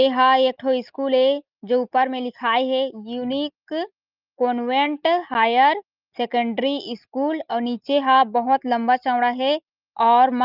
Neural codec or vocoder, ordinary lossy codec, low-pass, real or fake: none; Opus, 24 kbps; 5.4 kHz; real